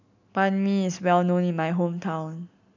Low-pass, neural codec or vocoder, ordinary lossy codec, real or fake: 7.2 kHz; none; none; real